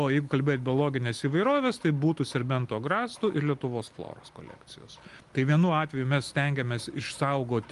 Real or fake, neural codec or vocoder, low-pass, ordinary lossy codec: real; none; 10.8 kHz; Opus, 32 kbps